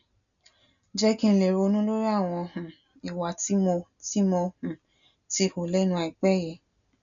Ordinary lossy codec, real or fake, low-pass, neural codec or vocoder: none; real; 7.2 kHz; none